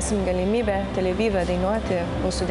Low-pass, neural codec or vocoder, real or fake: 10.8 kHz; none; real